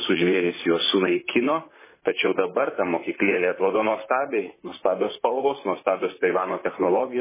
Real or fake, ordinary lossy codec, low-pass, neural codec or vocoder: fake; MP3, 16 kbps; 3.6 kHz; vocoder, 44.1 kHz, 128 mel bands, Pupu-Vocoder